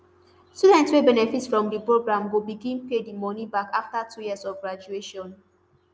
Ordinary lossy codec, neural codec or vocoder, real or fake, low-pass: none; none; real; none